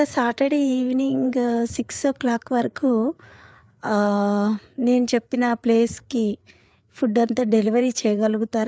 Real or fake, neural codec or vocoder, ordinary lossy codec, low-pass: fake; codec, 16 kHz, 4 kbps, FreqCodec, larger model; none; none